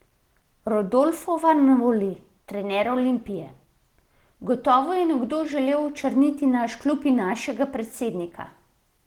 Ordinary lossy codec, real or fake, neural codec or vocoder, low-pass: Opus, 16 kbps; real; none; 19.8 kHz